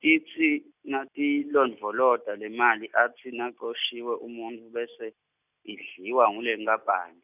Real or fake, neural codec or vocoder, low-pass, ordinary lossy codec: real; none; 3.6 kHz; none